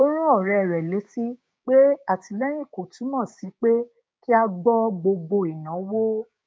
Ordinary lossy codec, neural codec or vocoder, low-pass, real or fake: none; codec, 16 kHz, 6 kbps, DAC; none; fake